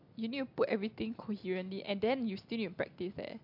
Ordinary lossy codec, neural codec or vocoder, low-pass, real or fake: MP3, 48 kbps; none; 5.4 kHz; real